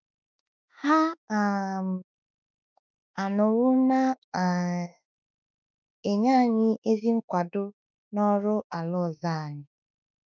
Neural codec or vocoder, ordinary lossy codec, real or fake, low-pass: autoencoder, 48 kHz, 32 numbers a frame, DAC-VAE, trained on Japanese speech; none; fake; 7.2 kHz